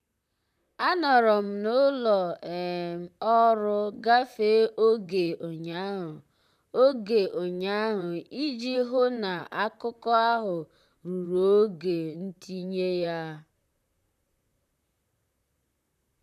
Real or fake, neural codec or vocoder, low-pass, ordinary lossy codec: fake; vocoder, 44.1 kHz, 128 mel bands, Pupu-Vocoder; 14.4 kHz; none